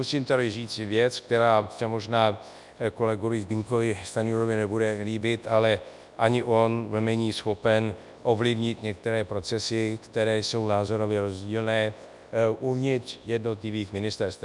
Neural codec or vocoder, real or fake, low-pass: codec, 24 kHz, 0.9 kbps, WavTokenizer, large speech release; fake; 10.8 kHz